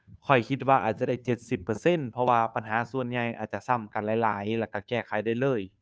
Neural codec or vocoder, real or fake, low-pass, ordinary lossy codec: codec, 16 kHz, 2 kbps, FunCodec, trained on Chinese and English, 25 frames a second; fake; none; none